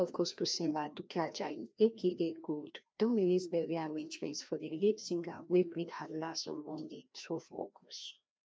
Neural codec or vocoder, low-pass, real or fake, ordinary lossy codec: codec, 16 kHz, 1 kbps, FreqCodec, larger model; none; fake; none